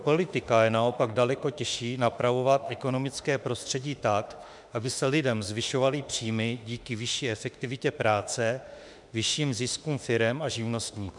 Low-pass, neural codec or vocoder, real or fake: 10.8 kHz; autoencoder, 48 kHz, 32 numbers a frame, DAC-VAE, trained on Japanese speech; fake